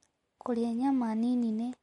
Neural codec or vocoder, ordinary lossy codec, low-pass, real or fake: none; MP3, 48 kbps; 19.8 kHz; real